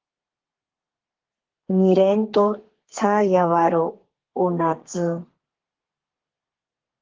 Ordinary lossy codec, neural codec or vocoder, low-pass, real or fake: Opus, 16 kbps; codec, 32 kHz, 1.9 kbps, SNAC; 7.2 kHz; fake